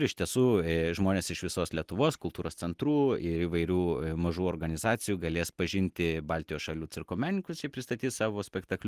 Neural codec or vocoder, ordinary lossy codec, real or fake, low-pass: none; Opus, 24 kbps; real; 14.4 kHz